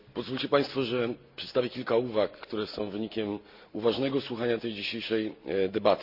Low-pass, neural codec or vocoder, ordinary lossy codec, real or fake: 5.4 kHz; none; none; real